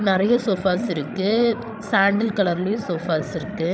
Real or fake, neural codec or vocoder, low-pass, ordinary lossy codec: fake; codec, 16 kHz, 16 kbps, FreqCodec, larger model; none; none